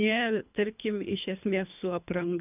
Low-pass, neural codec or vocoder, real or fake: 3.6 kHz; codec, 24 kHz, 3 kbps, HILCodec; fake